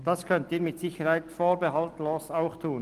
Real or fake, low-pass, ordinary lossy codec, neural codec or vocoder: real; 14.4 kHz; Opus, 24 kbps; none